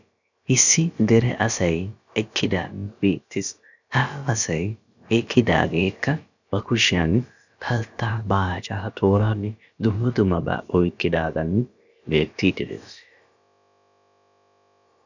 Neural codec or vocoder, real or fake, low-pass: codec, 16 kHz, about 1 kbps, DyCAST, with the encoder's durations; fake; 7.2 kHz